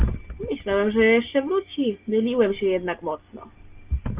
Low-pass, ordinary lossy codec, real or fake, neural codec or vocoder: 3.6 kHz; Opus, 32 kbps; real; none